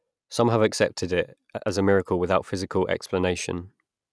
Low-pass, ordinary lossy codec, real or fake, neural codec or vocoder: none; none; real; none